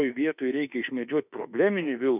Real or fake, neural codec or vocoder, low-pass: fake; autoencoder, 48 kHz, 32 numbers a frame, DAC-VAE, trained on Japanese speech; 3.6 kHz